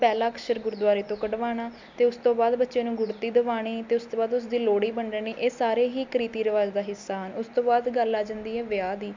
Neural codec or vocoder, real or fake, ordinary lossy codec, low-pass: none; real; MP3, 64 kbps; 7.2 kHz